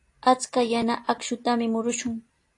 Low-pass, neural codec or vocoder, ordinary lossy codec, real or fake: 10.8 kHz; none; AAC, 48 kbps; real